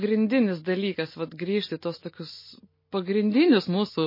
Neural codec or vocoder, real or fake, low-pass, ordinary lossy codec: none; real; 5.4 kHz; MP3, 24 kbps